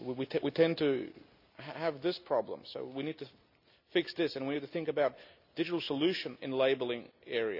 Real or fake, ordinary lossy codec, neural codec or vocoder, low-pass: real; none; none; 5.4 kHz